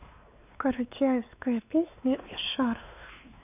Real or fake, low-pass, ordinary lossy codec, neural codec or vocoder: fake; 3.6 kHz; none; codec, 16 kHz, 2 kbps, X-Codec, WavLM features, trained on Multilingual LibriSpeech